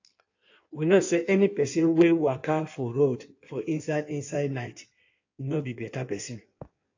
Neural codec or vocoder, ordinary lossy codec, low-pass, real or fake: codec, 16 kHz in and 24 kHz out, 1.1 kbps, FireRedTTS-2 codec; AAC, 48 kbps; 7.2 kHz; fake